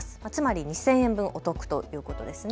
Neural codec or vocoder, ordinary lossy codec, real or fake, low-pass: none; none; real; none